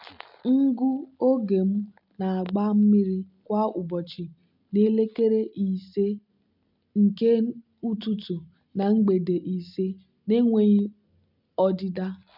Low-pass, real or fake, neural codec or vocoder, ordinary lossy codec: 5.4 kHz; real; none; none